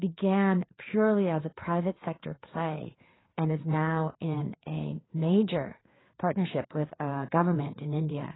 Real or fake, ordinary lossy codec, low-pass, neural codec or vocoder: fake; AAC, 16 kbps; 7.2 kHz; codec, 16 kHz, 4 kbps, FreqCodec, larger model